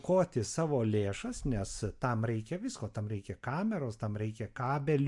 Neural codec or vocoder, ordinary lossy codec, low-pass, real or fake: none; MP3, 64 kbps; 10.8 kHz; real